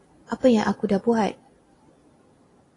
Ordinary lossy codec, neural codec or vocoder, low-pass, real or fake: AAC, 32 kbps; none; 10.8 kHz; real